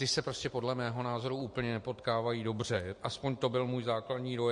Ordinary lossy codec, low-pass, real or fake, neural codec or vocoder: MP3, 48 kbps; 10.8 kHz; real; none